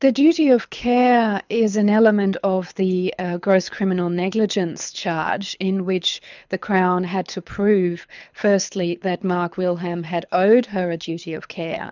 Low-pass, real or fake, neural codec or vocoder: 7.2 kHz; fake; codec, 24 kHz, 6 kbps, HILCodec